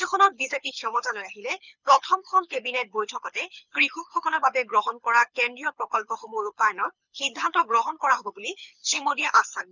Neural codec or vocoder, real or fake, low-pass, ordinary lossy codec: codec, 24 kHz, 6 kbps, HILCodec; fake; 7.2 kHz; none